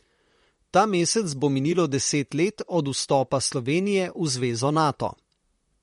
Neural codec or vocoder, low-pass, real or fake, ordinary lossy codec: vocoder, 44.1 kHz, 128 mel bands, Pupu-Vocoder; 19.8 kHz; fake; MP3, 48 kbps